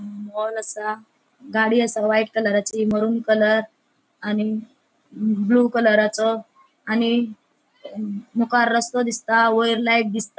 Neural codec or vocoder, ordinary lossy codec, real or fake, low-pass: none; none; real; none